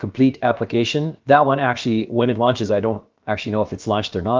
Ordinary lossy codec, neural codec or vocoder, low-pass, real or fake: Opus, 32 kbps; codec, 16 kHz, about 1 kbps, DyCAST, with the encoder's durations; 7.2 kHz; fake